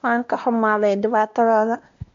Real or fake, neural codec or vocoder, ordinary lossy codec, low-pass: fake; codec, 16 kHz, 1 kbps, X-Codec, WavLM features, trained on Multilingual LibriSpeech; MP3, 48 kbps; 7.2 kHz